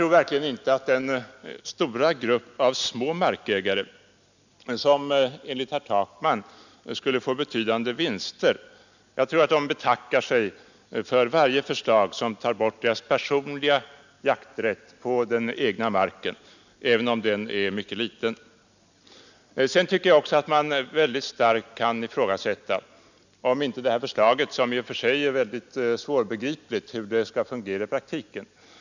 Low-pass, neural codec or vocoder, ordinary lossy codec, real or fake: 7.2 kHz; none; none; real